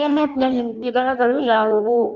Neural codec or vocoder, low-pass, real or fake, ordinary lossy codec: codec, 16 kHz in and 24 kHz out, 0.6 kbps, FireRedTTS-2 codec; 7.2 kHz; fake; none